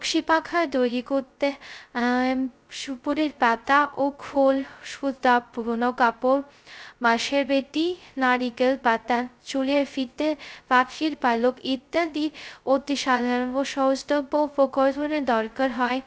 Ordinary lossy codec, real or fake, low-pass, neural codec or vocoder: none; fake; none; codec, 16 kHz, 0.2 kbps, FocalCodec